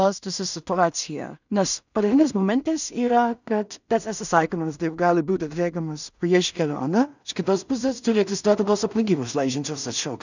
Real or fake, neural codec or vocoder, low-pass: fake; codec, 16 kHz in and 24 kHz out, 0.4 kbps, LongCat-Audio-Codec, two codebook decoder; 7.2 kHz